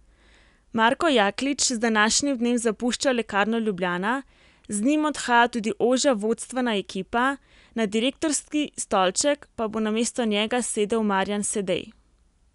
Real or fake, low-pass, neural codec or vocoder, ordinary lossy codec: real; 10.8 kHz; none; none